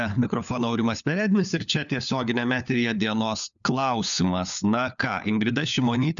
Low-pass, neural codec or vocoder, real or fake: 7.2 kHz; codec, 16 kHz, 4 kbps, FunCodec, trained on LibriTTS, 50 frames a second; fake